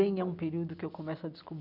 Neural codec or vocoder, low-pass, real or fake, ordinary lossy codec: none; 5.4 kHz; real; none